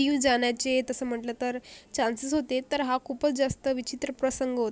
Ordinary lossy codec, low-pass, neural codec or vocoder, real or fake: none; none; none; real